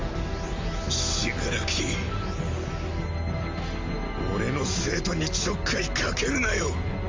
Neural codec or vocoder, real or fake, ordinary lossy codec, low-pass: none; real; Opus, 32 kbps; 7.2 kHz